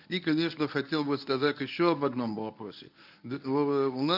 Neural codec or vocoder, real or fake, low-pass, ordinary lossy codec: codec, 24 kHz, 0.9 kbps, WavTokenizer, medium speech release version 1; fake; 5.4 kHz; none